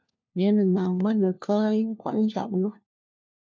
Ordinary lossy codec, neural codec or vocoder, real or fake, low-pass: MP3, 64 kbps; codec, 16 kHz, 1 kbps, FunCodec, trained on LibriTTS, 50 frames a second; fake; 7.2 kHz